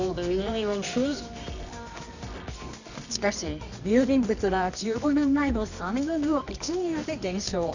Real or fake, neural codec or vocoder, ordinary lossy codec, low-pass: fake; codec, 24 kHz, 0.9 kbps, WavTokenizer, medium music audio release; none; 7.2 kHz